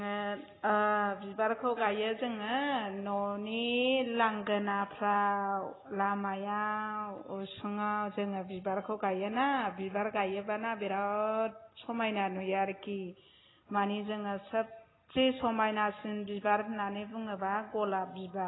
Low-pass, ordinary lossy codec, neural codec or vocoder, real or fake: 7.2 kHz; AAC, 16 kbps; none; real